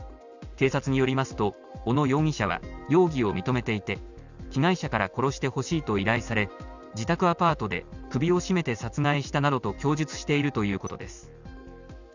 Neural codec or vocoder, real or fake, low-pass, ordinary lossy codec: none; real; 7.2 kHz; none